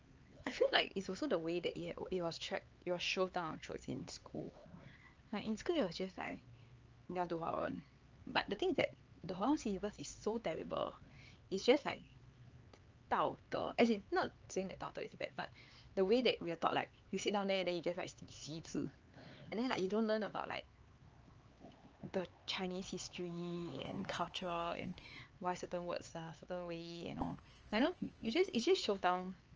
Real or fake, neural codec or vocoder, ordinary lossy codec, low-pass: fake; codec, 16 kHz, 4 kbps, X-Codec, HuBERT features, trained on LibriSpeech; Opus, 24 kbps; 7.2 kHz